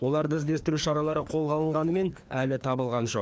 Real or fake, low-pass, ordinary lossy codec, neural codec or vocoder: fake; none; none; codec, 16 kHz, 2 kbps, FreqCodec, larger model